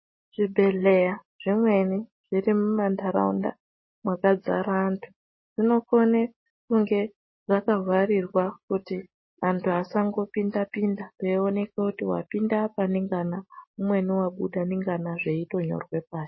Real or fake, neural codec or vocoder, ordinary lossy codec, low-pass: real; none; MP3, 24 kbps; 7.2 kHz